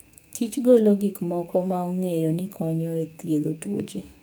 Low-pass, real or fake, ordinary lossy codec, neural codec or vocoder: none; fake; none; codec, 44.1 kHz, 2.6 kbps, SNAC